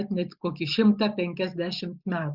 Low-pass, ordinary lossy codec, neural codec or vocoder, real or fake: 5.4 kHz; Opus, 64 kbps; none; real